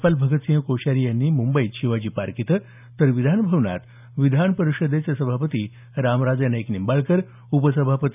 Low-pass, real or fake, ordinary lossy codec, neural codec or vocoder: 3.6 kHz; real; none; none